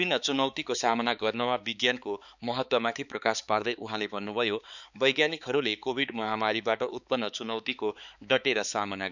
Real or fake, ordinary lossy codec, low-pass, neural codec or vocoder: fake; none; 7.2 kHz; codec, 16 kHz, 4 kbps, X-Codec, HuBERT features, trained on balanced general audio